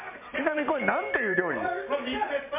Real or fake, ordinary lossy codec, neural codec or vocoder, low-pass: fake; MP3, 32 kbps; vocoder, 44.1 kHz, 128 mel bands every 512 samples, BigVGAN v2; 3.6 kHz